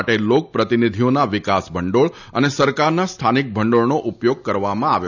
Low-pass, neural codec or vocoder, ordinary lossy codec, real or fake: 7.2 kHz; none; none; real